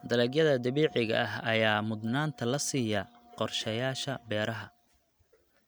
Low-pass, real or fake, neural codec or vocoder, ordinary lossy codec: none; real; none; none